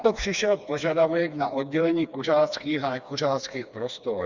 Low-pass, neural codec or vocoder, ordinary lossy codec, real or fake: 7.2 kHz; codec, 16 kHz, 2 kbps, FreqCodec, smaller model; Opus, 64 kbps; fake